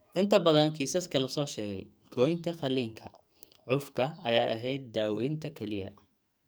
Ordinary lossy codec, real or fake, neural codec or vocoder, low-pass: none; fake; codec, 44.1 kHz, 2.6 kbps, SNAC; none